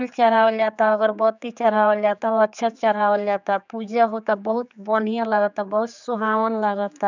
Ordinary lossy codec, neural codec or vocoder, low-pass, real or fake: none; codec, 16 kHz, 4 kbps, X-Codec, HuBERT features, trained on general audio; 7.2 kHz; fake